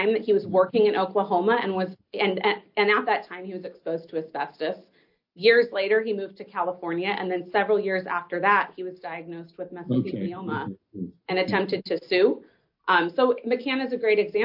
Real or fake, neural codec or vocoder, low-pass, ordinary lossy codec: real; none; 5.4 kHz; AAC, 48 kbps